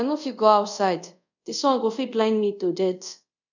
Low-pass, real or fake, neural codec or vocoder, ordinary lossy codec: 7.2 kHz; fake; codec, 24 kHz, 0.5 kbps, DualCodec; none